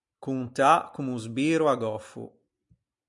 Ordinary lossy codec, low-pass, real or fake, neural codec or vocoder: MP3, 96 kbps; 10.8 kHz; real; none